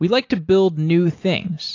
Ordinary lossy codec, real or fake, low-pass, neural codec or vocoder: AAC, 48 kbps; real; 7.2 kHz; none